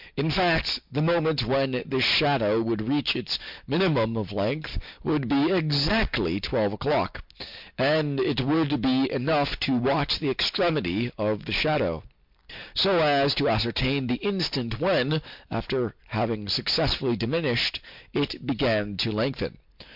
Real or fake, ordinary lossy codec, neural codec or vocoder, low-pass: real; MP3, 48 kbps; none; 5.4 kHz